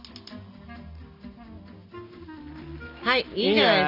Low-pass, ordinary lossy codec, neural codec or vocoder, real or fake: 5.4 kHz; none; none; real